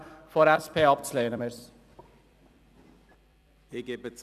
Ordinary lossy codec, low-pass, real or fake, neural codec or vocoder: none; 14.4 kHz; fake; vocoder, 44.1 kHz, 128 mel bands every 256 samples, BigVGAN v2